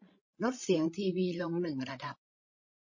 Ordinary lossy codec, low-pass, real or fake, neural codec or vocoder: MP3, 32 kbps; 7.2 kHz; fake; codec, 16 kHz, 16 kbps, FreqCodec, larger model